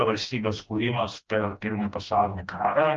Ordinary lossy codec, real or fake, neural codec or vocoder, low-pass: Opus, 32 kbps; fake; codec, 16 kHz, 1 kbps, FreqCodec, smaller model; 7.2 kHz